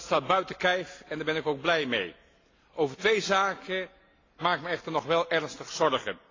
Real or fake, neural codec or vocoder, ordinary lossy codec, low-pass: real; none; AAC, 32 kbps; 7.2 kHz